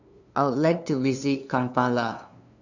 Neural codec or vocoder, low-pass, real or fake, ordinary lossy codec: codec, 16 kHz, 2 kbps, FunCodec, trained on LibriTTS, 25 frames a second; 7.2 kHz; fake; none